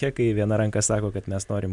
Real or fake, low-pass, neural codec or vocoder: real; 10.8 kHz; none